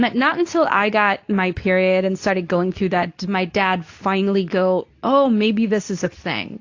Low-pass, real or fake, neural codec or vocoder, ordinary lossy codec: 7.2 kHz; fake; codec, 24 kHz, 0.9 kbps, WavTokenizer, medium speech release version 2; AAC, 48 kbps